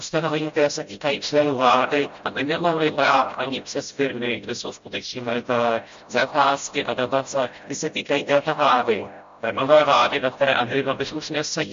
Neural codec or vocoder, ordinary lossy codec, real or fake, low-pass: codec, 16 kHz, 0.5 kbps, FreqCodec, smaller model; MP3, 48 kbps; fake; 7.2 kHz